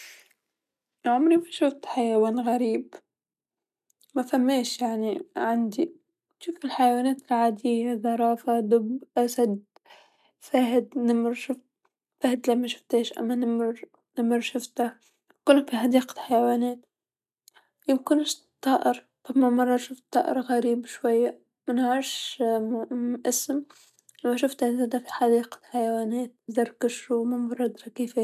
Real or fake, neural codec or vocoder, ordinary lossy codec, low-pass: real; none; none; 14.4 kHz